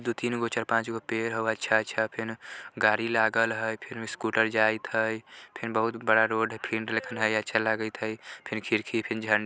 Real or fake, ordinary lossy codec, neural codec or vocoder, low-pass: real; none; none; none